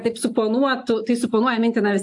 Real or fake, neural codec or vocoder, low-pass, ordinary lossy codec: real; none; 10.8 kHz; AAC, 64 kbps